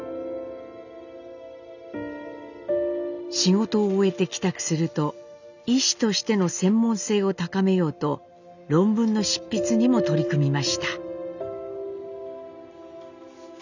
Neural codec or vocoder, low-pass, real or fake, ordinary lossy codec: none; 7.2 kHz; real; none